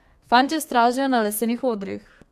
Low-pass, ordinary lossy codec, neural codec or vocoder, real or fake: 14.4 kHz; AAC, 96 kbps; codec, 32 kHz, 1.9 kbps, SNAC; fake